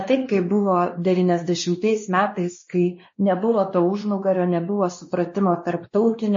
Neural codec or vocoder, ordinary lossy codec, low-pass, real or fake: codec, 16 kHz, 2 kbps, X-Codec, WavLM features, trained on Multilingual LibriSpeech; MP3, 32 kbps; 7.2 kHz; fake